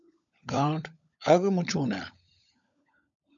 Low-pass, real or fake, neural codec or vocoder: 7.2 kHz; fake; codec, 16 kHz, 16 kbps, FunCodec, trained on LibriTTS, 50 frames a second